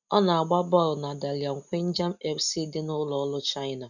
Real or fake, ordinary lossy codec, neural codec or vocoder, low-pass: real; none; none; 7.2 kHz